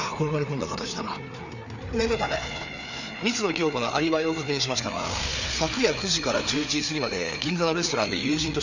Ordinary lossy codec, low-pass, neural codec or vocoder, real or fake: none; 7.2 kHz; codec, 16 kHz, 4 kbps, FreqCodec, larger model; fake